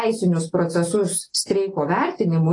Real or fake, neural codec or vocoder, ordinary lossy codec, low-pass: real; none; AAC, 32 kbps; 10.8 kHz